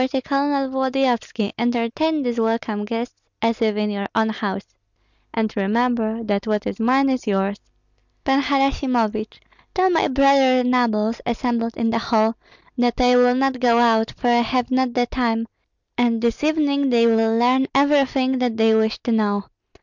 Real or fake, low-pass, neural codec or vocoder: real; 7.2 kHz; none